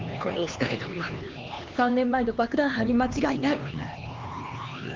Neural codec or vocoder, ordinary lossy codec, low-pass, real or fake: codec, 16 kHz, 2 kbps, X-Codec, HuBERT features, trained on LibriSpeech; Opus, 24 kbps; 7.2 kHz; fake